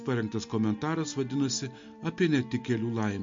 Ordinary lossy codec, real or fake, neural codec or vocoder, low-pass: MP3, 48 kbps; real; none; 7.2 kHz